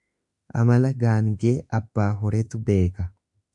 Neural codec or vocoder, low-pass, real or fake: autoencoder, 48 kHz, 32 numbers a frame, DAC-VAE, trained on Japanese speech; 10.8 kHz; fake